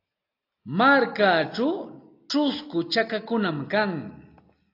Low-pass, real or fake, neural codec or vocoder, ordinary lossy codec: 5.4 kHz; real; none; AAC, 32 kbps